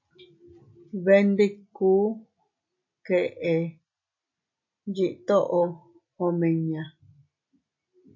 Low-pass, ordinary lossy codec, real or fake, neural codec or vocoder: 7.2 kHz; MP3, 64 kbps; real; none